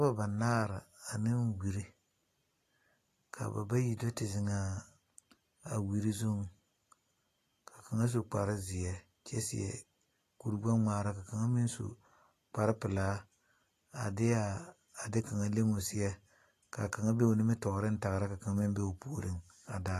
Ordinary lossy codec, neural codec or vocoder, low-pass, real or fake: AAC, 48 kbps; none; 14.4 kHz; real